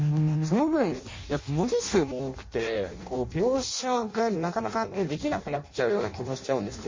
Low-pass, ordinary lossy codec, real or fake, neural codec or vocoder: 7.2 kHz; MP3, 32 kbps; fake; codec, 16 kHz in and 24 kHz out, 0.6 kbps, FireRedTTS-2 codec